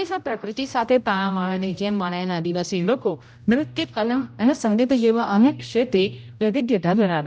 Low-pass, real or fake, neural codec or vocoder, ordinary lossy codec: none; fake; codec, 16 kHz, 0.5 kbps, X-Codec, HuBERT features, trained on general audio; none